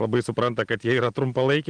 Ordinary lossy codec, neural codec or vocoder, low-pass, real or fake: Opus, 24 kbps; none; 9.9 kHz; real